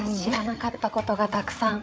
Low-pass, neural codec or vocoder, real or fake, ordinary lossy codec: none; codec, 16 kHz, 8 kbps, FreqCodec, larger model; fake; none